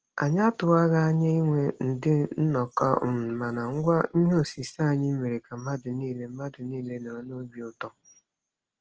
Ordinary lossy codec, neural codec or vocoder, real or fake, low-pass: Opus, 16 kbps; none; real; 7.2 kHz